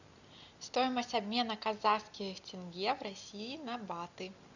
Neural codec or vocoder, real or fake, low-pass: none; real; 7.2 kHz